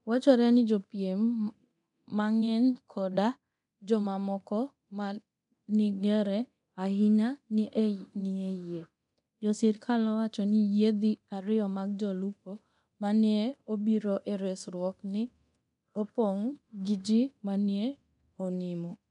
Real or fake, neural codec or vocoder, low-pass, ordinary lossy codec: fake; codec, 24 kHz, 0.9 kbps, DualCodec; 10.8 kHz; none